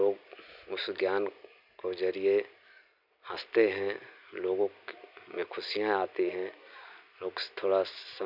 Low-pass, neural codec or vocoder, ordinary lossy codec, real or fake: 5.4 kHz; none; none; real